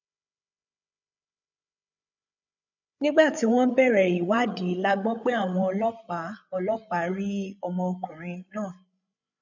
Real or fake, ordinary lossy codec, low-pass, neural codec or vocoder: fake; none; 7.2 kHz; codec, 16 kHz, 8 kbps, FreqCodec, larger model